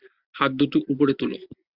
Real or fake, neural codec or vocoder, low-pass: real; none; 5.4 kHz